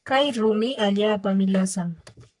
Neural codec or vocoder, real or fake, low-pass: codec, 44.1 kHz, 3.4 kbps, Pupu-Codec; fake; 10.8 kHz